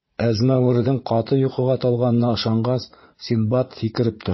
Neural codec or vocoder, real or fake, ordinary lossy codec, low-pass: vocoder, 44.1 kHz, 80 mel bands, Vocos; fake; MP3, 24 kbps; 7.2 kHz